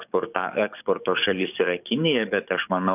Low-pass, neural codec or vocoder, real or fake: 3.6 kHz; codec, 44.1 kHz, 7.8 kbps, DAC; fake